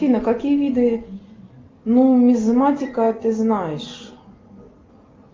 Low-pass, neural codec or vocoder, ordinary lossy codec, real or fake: 7.2 kHz; none; Opus, 24 kbps; real